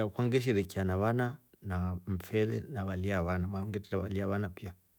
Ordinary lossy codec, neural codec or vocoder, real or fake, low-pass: none; autoencoder, 48 kHz, 128 numbers a frame, DAC-VAE, trained on Japanese speech; fake; none